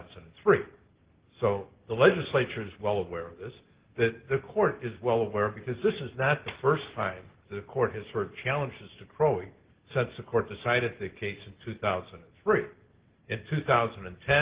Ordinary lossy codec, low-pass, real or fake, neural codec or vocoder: Opus, 16 kbps; 3.6 kHz; real; none